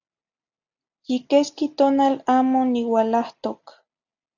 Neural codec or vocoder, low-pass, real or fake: none; 7.2 kHz; real